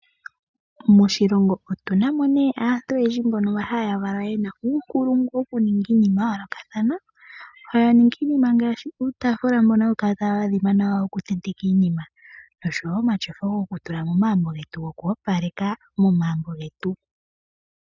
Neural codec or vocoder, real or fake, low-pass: none; real; 7.2 kHz